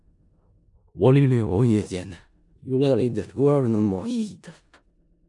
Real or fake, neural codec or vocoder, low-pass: fake; codec, 16 kHz in and 24 kHz out, 0.4 kbps, LongCat-Audio-Codec, four codebook decoder; 10.8 kHz